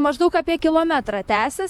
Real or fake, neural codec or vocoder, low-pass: fake; vocoder, 44.1 kHz, 128 mel bands, Pupu-Vocoder; 19.8 kHz